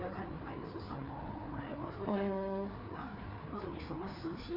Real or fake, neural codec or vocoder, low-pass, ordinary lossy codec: fake; codec, 16 kHz, 4 kbps, FreqCodec, larger model; 5.4 kHz; none